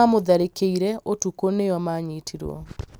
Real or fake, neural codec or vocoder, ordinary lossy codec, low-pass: real; none; none; none